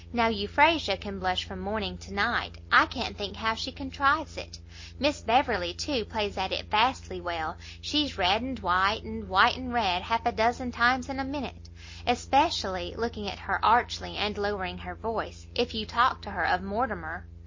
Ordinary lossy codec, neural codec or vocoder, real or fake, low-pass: MP3, 32 kbps; none; real; 7.2 kHz